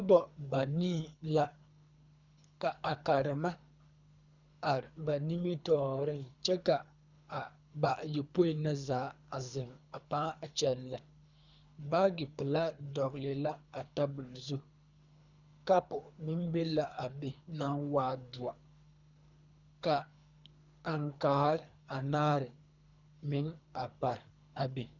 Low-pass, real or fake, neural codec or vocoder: 7.2 kHz; fake; codec, 24 kHz, 3 kbps, HILCodec